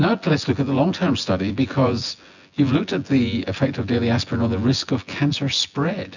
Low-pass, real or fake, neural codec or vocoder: 7.2 kHz; fake; vocoder, 24 kHz, 100 mel bands, Vocos